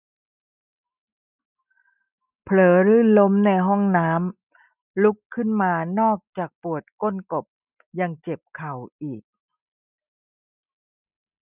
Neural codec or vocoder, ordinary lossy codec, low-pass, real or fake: none; none; 3.6 kHz; real